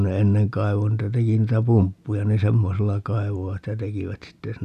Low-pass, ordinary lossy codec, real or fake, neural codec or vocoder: 14.4 kHz; none; real; none